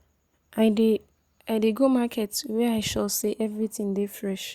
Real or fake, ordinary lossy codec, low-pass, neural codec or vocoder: real; Opus, 64 kbps; 19.8 kHz; none